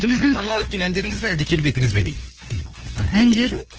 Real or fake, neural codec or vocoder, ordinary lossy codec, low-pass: fake; codec, 16 kHz, 2 kbps, FunCodec, trained on LibriTTS, 25 frames a second; Opus, 16 kbps; 7.2 kHz